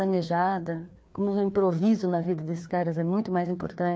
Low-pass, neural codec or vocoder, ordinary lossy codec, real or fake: none; codec, 16 kHz, 2 kbps, FreqCodec, larger model; none; fake